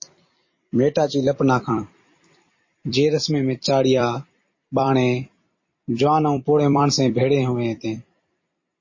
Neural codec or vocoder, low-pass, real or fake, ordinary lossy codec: vocoder, 44.1 kHz, 128 mel bands every 256 samples, BigVGAN v2; 7.2 kHz; fake; MP3, 32 kbps